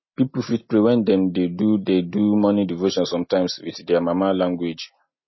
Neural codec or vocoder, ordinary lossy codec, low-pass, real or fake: none; MP3, 24 kbps; 7.2 kHz; real